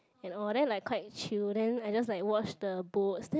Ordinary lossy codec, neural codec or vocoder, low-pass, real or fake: none; none; none; real